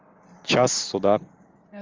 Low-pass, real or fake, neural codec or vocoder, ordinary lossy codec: 7.2 kHz; real; none; Opus, 32 kbps